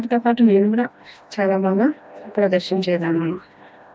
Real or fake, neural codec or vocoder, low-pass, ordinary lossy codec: fake; codec, 16 kHz, 1 kbps, FreqCodec, smaller model; none; none